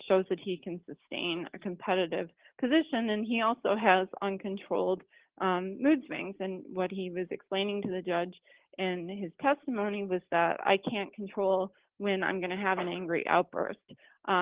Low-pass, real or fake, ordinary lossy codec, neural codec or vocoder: 3.6 kHz; real; Opus, 16 kbps; none